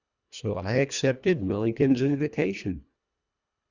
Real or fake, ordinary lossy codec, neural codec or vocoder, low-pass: fake; Opus, 64 kbps; codec, 24 kHz, 1.5 kbps, HILCodec; 7.2 kHz